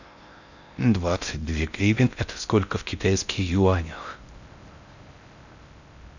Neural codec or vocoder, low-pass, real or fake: codec, 16 kHz in and 24 kHz out, 0.6 kbps, FocalCodec, streaming, 4096 codes; 7.2 kHz; fake